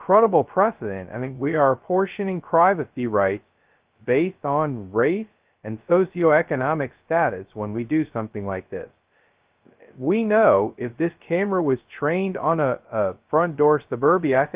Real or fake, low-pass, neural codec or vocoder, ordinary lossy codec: fake; 3.6 kHz; codec, 16 kHz, 0.2 kbps, FocalCodec; Opus, 32 kbps